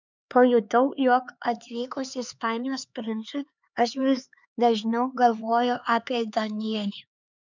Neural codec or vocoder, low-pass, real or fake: codec, 16 kHz, 4 kbps, X-Codec, HuBERT features, trained on LibriSpeech; 7.2 kHz; fake